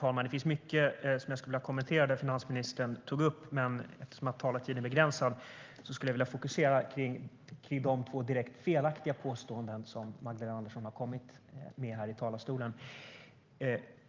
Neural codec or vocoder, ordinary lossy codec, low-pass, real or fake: none; Opus, 24 kbps; 7.2 kHz; real